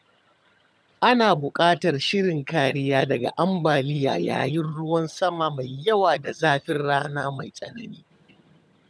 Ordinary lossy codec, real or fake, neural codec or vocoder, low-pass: none; fake; vocoder, 22.05 kHz, 80 mel bands, HiFi-GAN; none